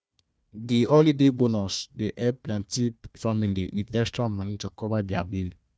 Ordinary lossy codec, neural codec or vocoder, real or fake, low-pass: none; codec, 16 kHz, 1 kbps, FunCodec, trained on Chinese and English, 50 frames a second; fake; none